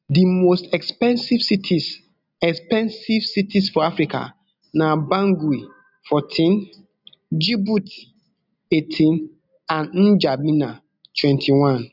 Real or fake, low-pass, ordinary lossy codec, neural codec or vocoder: real; 5.4 kHz; none; none